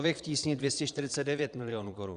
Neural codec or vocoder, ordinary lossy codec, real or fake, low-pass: vocoder, 22.05 kHz, 80 mel bands, Vocos; Opus, 64 kbps; fake; 9.9 kHz